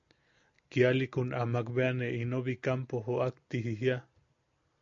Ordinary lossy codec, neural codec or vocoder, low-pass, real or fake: MP3, 48 kbps; none; 7.2 kHz; real